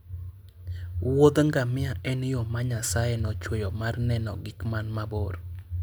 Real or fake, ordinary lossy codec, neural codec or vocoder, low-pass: real; none; none; none